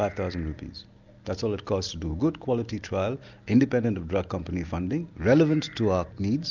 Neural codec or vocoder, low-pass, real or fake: none; 7.2 kHz; real